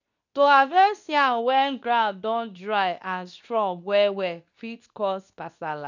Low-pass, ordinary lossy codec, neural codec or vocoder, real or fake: 7.2 kHz; none; codec, 24 kHz, 0.9 kbps, WavTokenizer, medium speech release version 2; fake